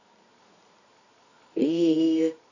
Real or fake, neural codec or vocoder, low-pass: fake; codec, 24 kHz, 0.9 kbps, WavTokenizer, medium music audio release; 7.2 kHz